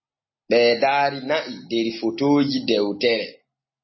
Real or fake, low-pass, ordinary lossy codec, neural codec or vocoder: real; 7.2 kHz; MP3, 24 kbps; none